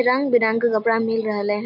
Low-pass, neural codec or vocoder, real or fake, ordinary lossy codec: 5.4 kHz; none; real; MP3, 48 kbps